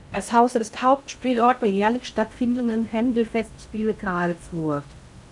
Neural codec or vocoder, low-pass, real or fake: codec, 16 kHz in and 24 kHz out, 0.6 kbps, FocalCodec, streaming, 2048 codes; 10.8 kHz; fake